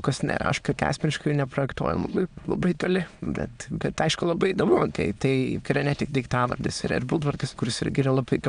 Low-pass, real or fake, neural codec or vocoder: 9.9 kHz; fake; autoencoder, 22.05 kHz, a latent of 192 numbers a frame, VITS, trained on many speakers